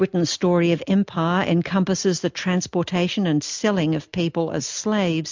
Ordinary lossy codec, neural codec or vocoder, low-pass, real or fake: MP3, 64 kbps; none; 7.2 kHz; real